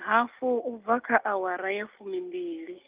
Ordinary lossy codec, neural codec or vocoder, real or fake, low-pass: Opus, 24 kbps; none; real; 3.6 kHz